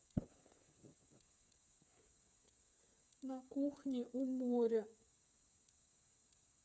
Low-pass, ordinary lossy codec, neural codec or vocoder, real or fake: none; none; codec, 16 kHz, 8 kbps, FreqCodec, smaller model; fake